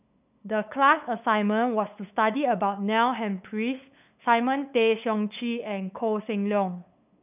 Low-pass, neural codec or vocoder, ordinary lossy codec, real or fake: 3.6 kHz; codec, 16 kHz, 8 kbps, FunCodec, trained on LibriTTS, 25 frames a second; none; fake